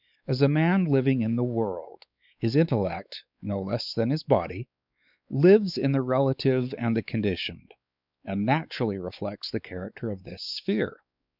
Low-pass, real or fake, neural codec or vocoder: 5.4 kHz; fake; codec, 16 kHz, 4 kbps, X-Codec, WavLM features, trained on Multilingual LibriSpeech